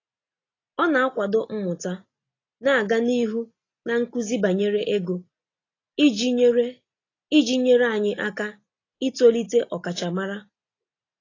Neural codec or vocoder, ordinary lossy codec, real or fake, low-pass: none; AAC, 32 kbps; real; 7.2 kHz